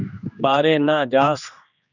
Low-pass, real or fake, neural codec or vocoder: 7.2 kHz; fake; codec, 16 kHz, 2 kbps, X-Codec, HuBERT features, trained on general audio